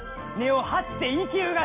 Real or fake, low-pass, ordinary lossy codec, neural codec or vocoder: real; 3.6 kHz; MP3, 32 kbps; none